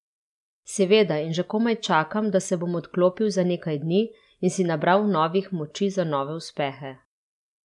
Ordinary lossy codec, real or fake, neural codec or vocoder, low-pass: none; real; none; 10.8 kHz